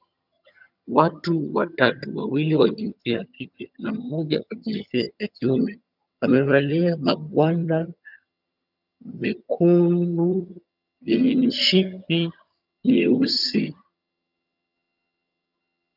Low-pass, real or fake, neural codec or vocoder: 5.4 kHz; fake; vocoder, 22.05 kHz, 80 mel bands, HiFi-GAN